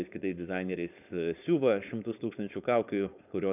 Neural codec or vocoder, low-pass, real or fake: codec, 16 kHz, 4.8 kbps, FACodec; 3.6 kHz; fake